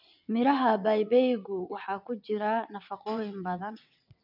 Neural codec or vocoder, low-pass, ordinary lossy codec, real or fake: vocoder, 22.05 kHz, 80 mel bands, WaveNeXt; 5.4 kHz; none; fake